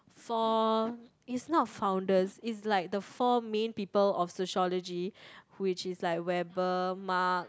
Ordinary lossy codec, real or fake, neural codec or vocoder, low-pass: none; real; none; none